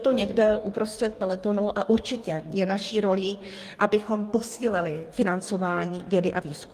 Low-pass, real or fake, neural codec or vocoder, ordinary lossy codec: 14.4 kHz; fake; codec, 44.1 kHz, 2.6 kbps, DAC; Opus, 32 kbps